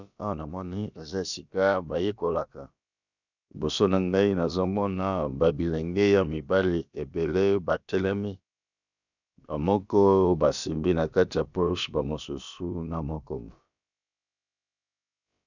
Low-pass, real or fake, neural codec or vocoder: 7.2 kHz; fake; codec, 16 kHz, about 1 kbps, DyCAST, with the encoder's durations